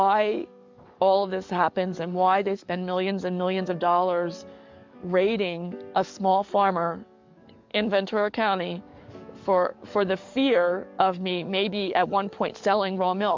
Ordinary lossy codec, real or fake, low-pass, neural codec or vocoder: MP3, 64 kbps; fake; 7.2 kHz; codec, 44.1 kHz, 7.8 kbps, DAC